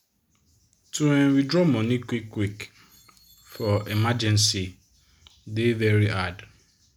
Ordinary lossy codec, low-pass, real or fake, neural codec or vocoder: MP3, 96 kbps; 19.8 kHz; real; none